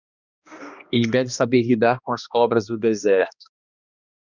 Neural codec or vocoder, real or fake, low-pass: codec, 16 kHz, 1 kbps, X-Codec, HuBERT features, trained on balanced general audio; fake; 7.2 kHz